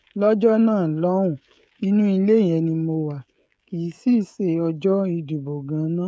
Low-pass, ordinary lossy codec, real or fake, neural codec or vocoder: none; none; fake; codec, 16 kHz, 16 kbps, FreqCodec, smaller model